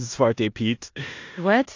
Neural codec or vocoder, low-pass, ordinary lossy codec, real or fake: codec, 16 kHz in and 24 kHz out, 0.9 kbps, LongCat-Audio-Codec, four codebook decoder; 7.2 kHz; AAC, 48 kbps; fake